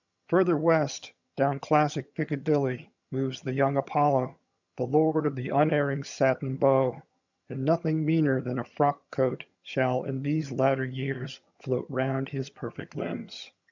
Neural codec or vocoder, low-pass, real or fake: vocoder, 22.05 kHz, 80 mel bands, HiFi-GAN; 7.2 kHz; fake